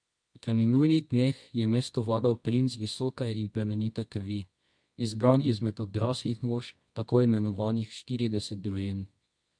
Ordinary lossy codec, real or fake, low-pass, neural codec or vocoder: MP3, 64 kbps; fake; 9.9 kHz; codec, 24 kHz, 0.9 kbps, WavTokenizer, medium music audio release